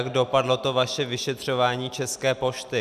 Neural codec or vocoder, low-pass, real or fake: none; 14.4 kHz; real